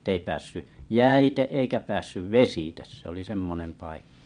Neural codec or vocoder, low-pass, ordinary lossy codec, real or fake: vocoder, 22.05 kHz, 80 mel bands, Vocos; 9.9 kHz; MP3, 64 kbps; fake